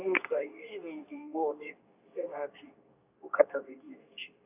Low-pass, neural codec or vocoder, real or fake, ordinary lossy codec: 3.6 kHz; codec, 24 kHz, 0.9 kbps, WavTokenizer, medium speech release version 2; fake; none